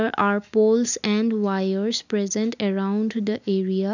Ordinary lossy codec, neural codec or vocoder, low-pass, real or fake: none; none; 7.2 kHz; real